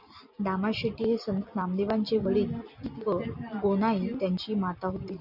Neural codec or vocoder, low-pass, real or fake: none; 5.4 kHz; real